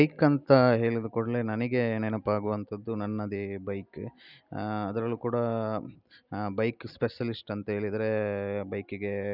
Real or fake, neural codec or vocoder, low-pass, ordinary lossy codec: real; none; 5.4 kHz; none